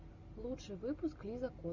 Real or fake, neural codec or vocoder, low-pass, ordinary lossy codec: real; none; 7.2 kHz; Opus, 64 kbps